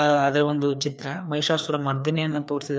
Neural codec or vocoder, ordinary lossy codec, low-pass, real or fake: codec, 16 kHz, 2 kbps, FreqCodec, larger model; none; none; fake